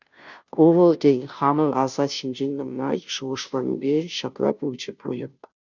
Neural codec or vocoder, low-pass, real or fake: codec, 16 kHz, 0.5 kbps, FunCodec, trained on Chinese and English, 25 frames a second; 7.2 kHz; fake